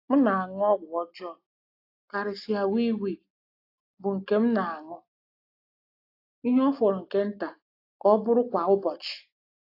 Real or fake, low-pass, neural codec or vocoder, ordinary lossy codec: real; 5.4 kHz; none; AAC, 32 kbps